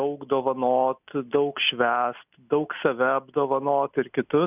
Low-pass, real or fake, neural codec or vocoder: 3.6 kHz; real; none